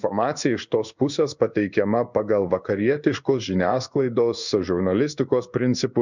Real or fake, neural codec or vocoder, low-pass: fake; codec, 16 kHz in and 24 kHz out, 1 kbps, XY-Tokenizer; 7.2 kHz